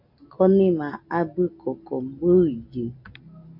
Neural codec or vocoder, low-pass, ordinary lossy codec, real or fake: none; 5.4 kHz; MP3, 48 kbps; real